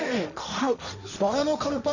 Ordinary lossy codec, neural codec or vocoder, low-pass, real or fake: none; codec, 16 kHz, 1.1 kbps, Voila-Tokenizer; 7.2 kHz; fake